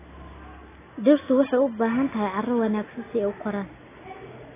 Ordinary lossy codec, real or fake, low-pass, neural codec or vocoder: AAC, 16 kbps; real; 3.6 kHz; none